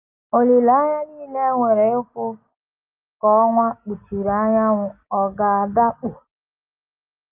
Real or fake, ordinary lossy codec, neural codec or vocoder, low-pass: real; Opus, 24 kbps; none; 3.6 kHz